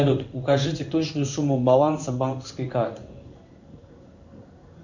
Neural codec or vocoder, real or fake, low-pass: codec, 16 kHz in and 24 kHz out, 1 kbps, XY-Tokenizer; fake; 7.2 kHz